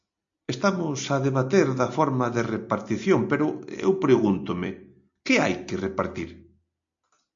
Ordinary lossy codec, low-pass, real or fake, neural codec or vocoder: MP3, 64 kbps; 7.2 kHz; real; none